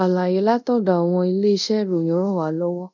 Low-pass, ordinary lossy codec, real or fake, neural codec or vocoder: 7.2 kHz; none; fake; codec, 24 kHz, 0.5 kbps, DualCodec